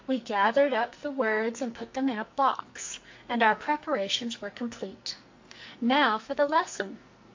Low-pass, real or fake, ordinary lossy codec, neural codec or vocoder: 7.2 kHz; fake; MP3, 48 kbps; codec, 44.1 kHz, 2.6 kbps, SNAC